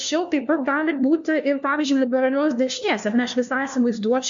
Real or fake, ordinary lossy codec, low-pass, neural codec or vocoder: fake; MP3, 64 kbps; 7.2 kHz; codec, 16 kHz, 1 kbps, FunCodec, trained on LibriTTS, 50 frames a second